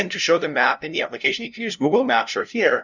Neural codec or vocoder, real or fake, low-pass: codec, 16 kHz, 0.5 kbps, FunCodec, trained on LibriTTS, 25 frames a second; fake; 7.2 kHz